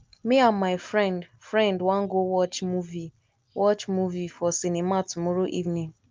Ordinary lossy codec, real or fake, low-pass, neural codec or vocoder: Opus, 24 kbps; real; 7.2 kHz; none